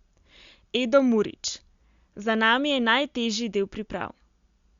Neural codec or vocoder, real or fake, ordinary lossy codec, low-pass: none; real; Opus, 64 kbps; 7.2 kHz